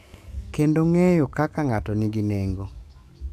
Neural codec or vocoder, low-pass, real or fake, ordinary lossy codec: codec, 44.1 kHz, 7.8 kbps, DAC; 14.4 kHz; fake; none